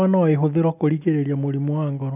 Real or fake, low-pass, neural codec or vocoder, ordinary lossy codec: real; 3.6 kHz; none; AAC, 32 kbps